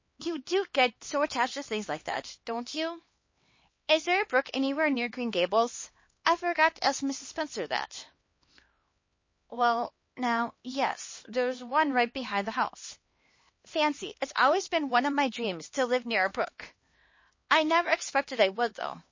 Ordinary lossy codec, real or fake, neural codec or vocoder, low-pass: MP3, 32 kbps; fake; codec, 16 kHz, 2 kbps, X-Codec, HuBERT features, trained on LibriSpeech; 7.2 kHz